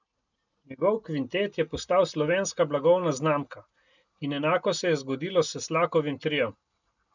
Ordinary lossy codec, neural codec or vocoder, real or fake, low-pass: none; none; real; 7.2 kHz